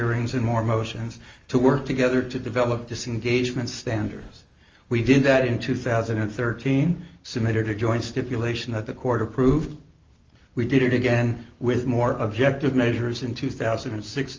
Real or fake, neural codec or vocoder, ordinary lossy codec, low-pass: real; none; Opus, 32 kbps; 7.2 kHz